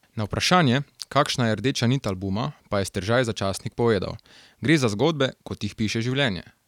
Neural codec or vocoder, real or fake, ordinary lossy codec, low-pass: none; real; none; 19.8 kHz